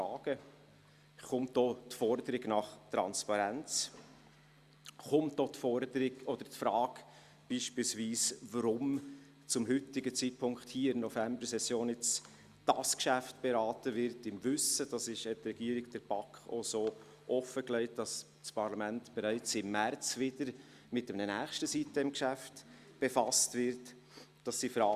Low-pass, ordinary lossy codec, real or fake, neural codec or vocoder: 14.4 kHz; Opus, 64 kbps; real; none